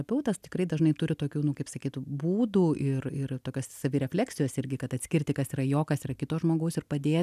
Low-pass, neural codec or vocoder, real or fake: 14.4 kHz; none; real